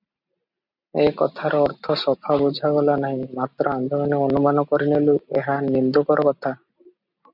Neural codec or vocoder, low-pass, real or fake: none; 5.4 kHz; real